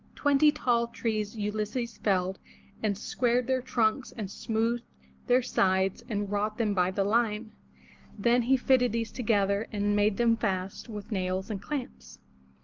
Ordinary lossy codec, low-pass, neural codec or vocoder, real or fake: Opus, 32 kbps; 7.2 kHz; none; real